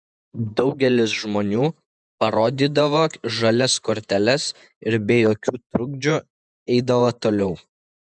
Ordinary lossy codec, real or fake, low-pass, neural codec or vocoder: MP3, 96 kbps; fake; 9.9 kHz; vocoder, 48 kHz, 128 mel bands, Vocos